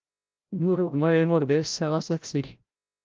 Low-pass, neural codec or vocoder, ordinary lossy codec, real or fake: 7.2 kHz; codec, 16 kHz, 0.5 kbps, FreqCodec, larger model; Opus, 24 kbps; fake